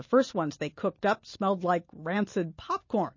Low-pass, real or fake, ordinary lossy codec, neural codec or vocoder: 7.2 kHz; real; MP3, 32 kbps; none